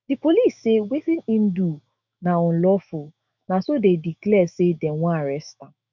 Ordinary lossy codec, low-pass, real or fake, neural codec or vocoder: none; 7.2 kHz; fake; autoencoder, 48 kHz, 128 numbers a frame, DAC-VAE, trained on Japanese speech